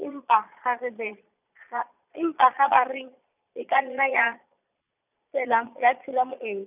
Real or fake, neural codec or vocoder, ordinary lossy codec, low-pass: fake; vocoder, 22.05 kHz, 80 mel bands, Vocos; none; 3.6 kHz